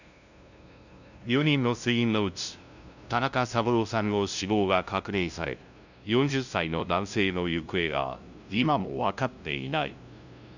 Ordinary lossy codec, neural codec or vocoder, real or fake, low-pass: none; codec, 16 kHz, 0.5 kbps, FunCodec, trained on LibriTTS, 25 frames a second; fake; 7.2 kHz